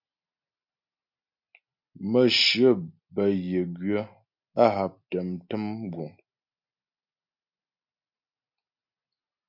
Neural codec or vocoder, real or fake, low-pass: none; real; 5.4 kHz